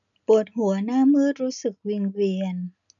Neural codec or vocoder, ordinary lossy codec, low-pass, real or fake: none; none; 7.2 kHz; real